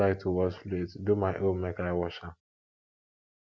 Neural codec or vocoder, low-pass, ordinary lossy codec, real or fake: none; none; none; real